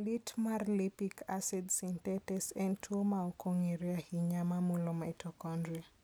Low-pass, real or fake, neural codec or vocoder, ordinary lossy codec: none; real; none; none